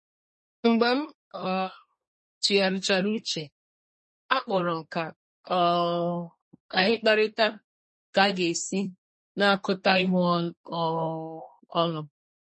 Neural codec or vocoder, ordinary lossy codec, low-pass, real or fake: codec, 24 kHz, 1 kbps, SNAC; MP3, 32 kbps; 10.8 kHz; fake